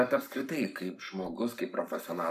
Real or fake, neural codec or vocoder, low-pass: fake; codec, 44.1 kHz, 7.8 kbps, Pupu-Codec; 14.4 kHz